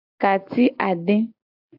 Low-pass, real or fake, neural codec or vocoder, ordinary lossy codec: 5.4 kHz; real; none; AAC, 48 kbps